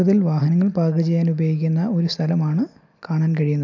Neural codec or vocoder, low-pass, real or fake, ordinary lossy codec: none; 7.2 kHz; real; none